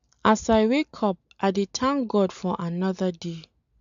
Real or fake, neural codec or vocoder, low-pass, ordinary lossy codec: real; none; 7.2 kHz; AAC, 96 kbps